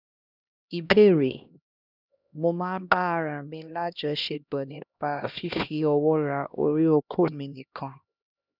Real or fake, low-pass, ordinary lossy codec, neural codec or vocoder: fake; 5.4 kHz; none; codec, 16 kHz, 1 kbps, X-Codec, HuBERT features, trained on LibriSpeech